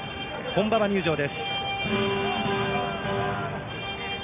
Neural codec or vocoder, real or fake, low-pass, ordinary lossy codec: none; real; 3.6 kHz; none